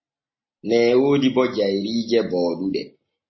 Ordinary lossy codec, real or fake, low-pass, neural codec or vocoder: MP3, 24 kbps; real; 7.2 kHz; none